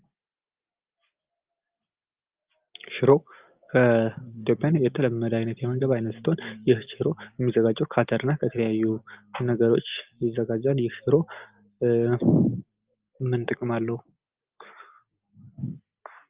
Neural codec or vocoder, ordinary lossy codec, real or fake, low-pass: none; Opus, 32 kbps; real; 3.6 kHz